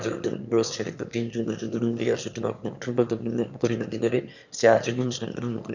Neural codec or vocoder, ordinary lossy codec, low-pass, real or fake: autoencoder, 22.05 kHz, a latent of 192 numbers a frame, VITS, trained on one speaker; none; 7.2 kHz; fake